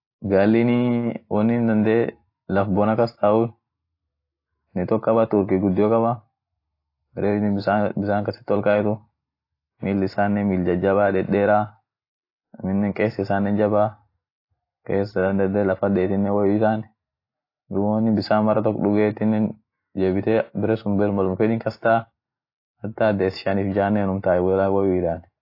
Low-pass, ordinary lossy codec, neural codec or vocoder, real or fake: 5.4 kHz; AAC, 32 kbps; none; real